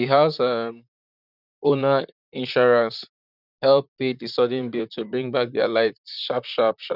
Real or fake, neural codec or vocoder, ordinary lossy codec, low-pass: fake; vocoder, 44.1 kHz, 128 mel bands, Pupu-Vocoder; none; 5.4 kHz